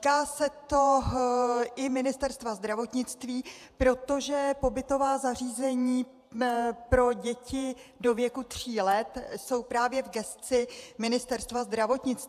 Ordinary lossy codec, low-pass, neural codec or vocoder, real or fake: AAC, 96 kbps; 14.4 kHz; vocoder, 48 kHz, 128 mel bands, Vocos; fake